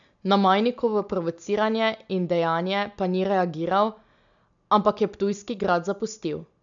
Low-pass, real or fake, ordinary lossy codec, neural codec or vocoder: 7.2 kHz; real; none; none